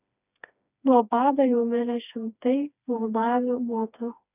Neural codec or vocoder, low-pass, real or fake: codec, 16 kHz, 2 kbps, FreqCodec, smaller model; 3.6 kHz; fake